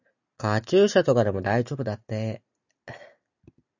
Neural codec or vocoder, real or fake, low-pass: none; real; 7.2 kHz